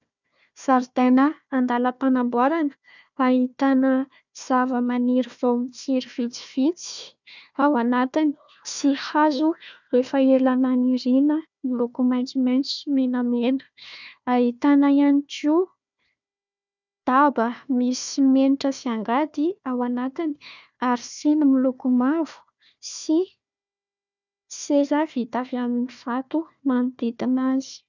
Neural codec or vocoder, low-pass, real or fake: codec, 16 kHz, 1 kbps, FunCodec, trained on Chinese and English, 50 frames a second; 7.2 kHz; fake